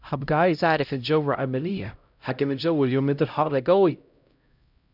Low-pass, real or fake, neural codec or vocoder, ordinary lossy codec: 5.4 kHz; fake; codec, 16 kHz, 0.5 kbps, X-Codec, HuBERT features, trained on LibriSpeech; none